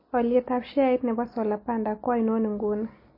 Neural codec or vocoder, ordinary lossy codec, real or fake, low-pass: none; MP3, 24 kbps; real; 5.4 kHz